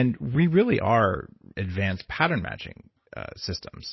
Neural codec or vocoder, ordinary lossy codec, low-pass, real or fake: none; MP3, 24 kbps; 7.2 kHz; real